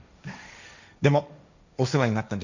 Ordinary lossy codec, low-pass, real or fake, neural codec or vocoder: none; 7.2 kHz; fake; codec, 16 kHz, 1.1 kbps, Voila-Tokenizer